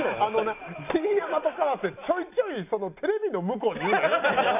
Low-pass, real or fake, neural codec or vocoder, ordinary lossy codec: 3.6 kHz; real; none; none